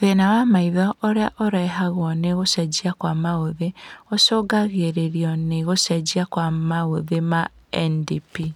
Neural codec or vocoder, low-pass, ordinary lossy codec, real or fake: none; 19.8 kHz; none; real